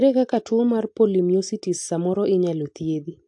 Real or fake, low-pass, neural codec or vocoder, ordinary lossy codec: real; 10.8 kHz; none; AAC, 64 kbps